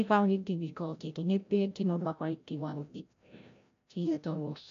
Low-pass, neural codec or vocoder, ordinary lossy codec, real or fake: 7.2 kHz; codec, 16 kHz, 0.5 kbps, FreqCodec, larger model; AAC, 64 kbps; fake